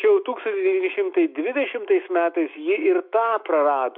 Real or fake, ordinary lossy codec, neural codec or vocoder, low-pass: fake; MP3, 48 kbps; autoencoder, 48 kHz, 128 numbers a frame, DAC-VAE, trained on Japanese speech; 5.4 kHz